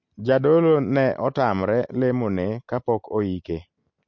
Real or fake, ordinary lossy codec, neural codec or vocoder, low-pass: real; MP3, 48 kbps; none; 7.2 kHz